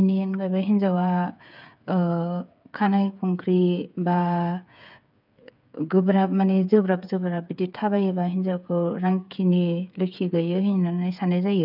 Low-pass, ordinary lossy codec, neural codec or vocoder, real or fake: 5.4 kHz; none; codec, 16 kHz, 8 kbps, FreqCodec, smaller model; fake